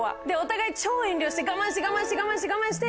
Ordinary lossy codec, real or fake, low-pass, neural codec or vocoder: none; real; none; none